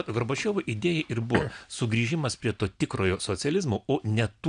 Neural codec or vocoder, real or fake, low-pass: none; real; 9.9 kHz